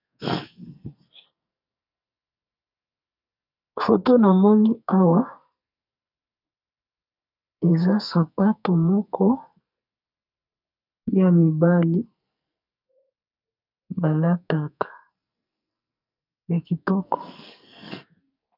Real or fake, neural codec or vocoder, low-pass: fake; codec, 44.1 kHz, 2.6 kbps, SNAC; 5.4 kHz